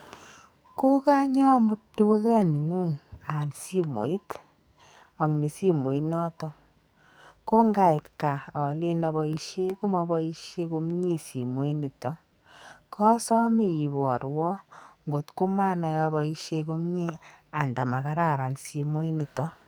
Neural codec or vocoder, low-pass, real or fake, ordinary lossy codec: codec, 44.1 kHz, 2.6 kbps, SNAC; none; fake; none